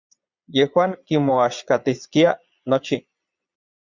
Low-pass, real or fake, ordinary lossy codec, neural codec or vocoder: 7.2 kHz; fake; Opus, 64 kbps; vocoder, 22.05 kHz, 80 mel bands, Vocos